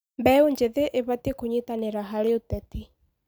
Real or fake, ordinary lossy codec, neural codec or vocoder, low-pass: real; none; none; none